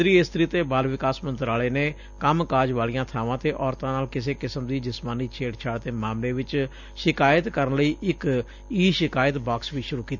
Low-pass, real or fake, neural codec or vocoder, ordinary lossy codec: 7.2 kHz; real; none; none